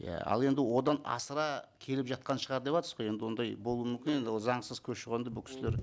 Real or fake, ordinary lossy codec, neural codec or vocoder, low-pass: real; none; none; none